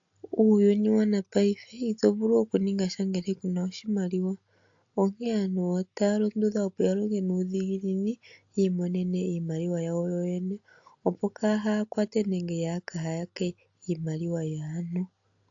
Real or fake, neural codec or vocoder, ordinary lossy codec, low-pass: real; none; AAC, 48 kbps; 7.2 kHz